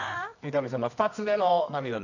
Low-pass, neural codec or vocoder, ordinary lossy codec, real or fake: 7.2 kHz; codec, 24 kHz, 0.9 kbps, WavTokenizer, medium music audio release; none; fake